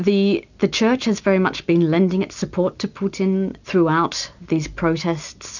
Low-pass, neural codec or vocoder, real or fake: 7.2 kHz; none; real